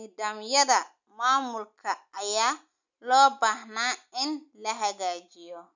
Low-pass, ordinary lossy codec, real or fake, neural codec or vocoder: 7.2 kHz; none; real; none